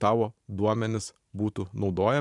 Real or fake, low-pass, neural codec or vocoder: fake; 10.8 kHz; vocoder, 44.1 kHz, 128 mel bands every 512 samples, BigVGAN v2